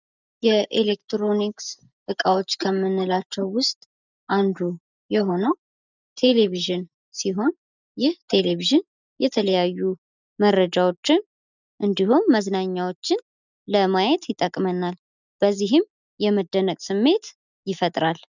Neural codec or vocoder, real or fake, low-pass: none; real; 7.2 kHz